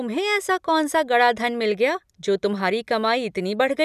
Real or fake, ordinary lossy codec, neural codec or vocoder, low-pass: real; none; none; 14.4 kHz